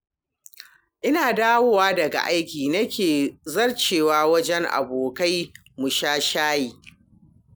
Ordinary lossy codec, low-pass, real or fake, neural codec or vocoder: none; none; real; none